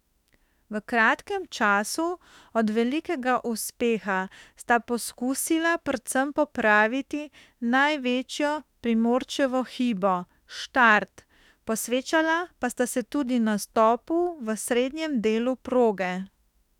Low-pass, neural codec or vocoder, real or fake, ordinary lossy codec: 19.8 kHz; autoencoder, 48 kHz, 32 numbers a frame, DAC-VAE, trained on Japanese speech; fake; none